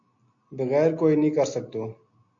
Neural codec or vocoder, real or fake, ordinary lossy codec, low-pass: none; real; MP3, 64 kbps; 7.2 kHz